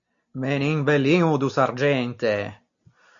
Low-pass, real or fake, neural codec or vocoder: 7.2 kHz; real; none